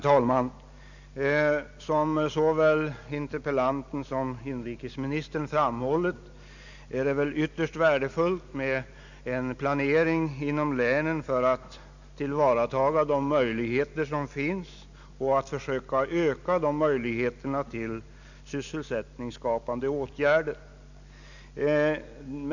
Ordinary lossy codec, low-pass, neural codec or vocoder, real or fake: none; 7.2 kHz; none; real